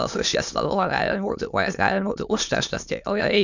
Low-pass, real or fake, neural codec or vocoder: 7.2 kHz; fake; autoencoder, 22.05 kHz, a latent of 192 numbers a frame, VITS, trained on many speakers